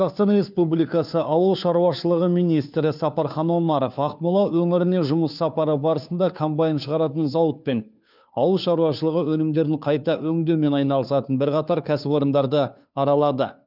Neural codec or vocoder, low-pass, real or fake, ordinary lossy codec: codec, 16 kHz, 2 kbps, FunCodec, trained on Chinese and English, 25 frames a second; 5.4 kHz; fake; none